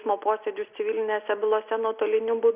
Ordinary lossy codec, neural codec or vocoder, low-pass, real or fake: Opus, 64 kbps; none; 3.6 kHz; real